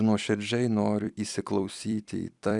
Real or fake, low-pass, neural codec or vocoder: fake; 10.8 kHz; vocoder, 44.1 kHz, 128 mel bands every 256 samples, BigVGAN v2